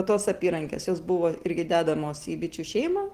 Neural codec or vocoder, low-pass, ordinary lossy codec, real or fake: none; 14.4 kHz; Opus, 16 kbps; real